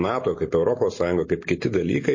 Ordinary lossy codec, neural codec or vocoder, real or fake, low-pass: MP3, 32 kbps; none; real; 7.2 kHz